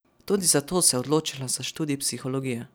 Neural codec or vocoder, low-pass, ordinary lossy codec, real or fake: none; none; none; real